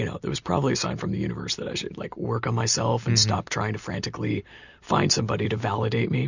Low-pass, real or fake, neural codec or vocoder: 7.2 kHz; real; none